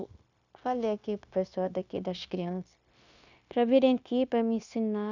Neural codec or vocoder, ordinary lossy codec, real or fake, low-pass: codec, 16 kHz, 0.9 kbps, LongCat-Audio-Codec; none; fake; 7.2 kHz